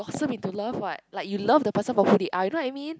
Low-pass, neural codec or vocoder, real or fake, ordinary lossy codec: none; none; real; none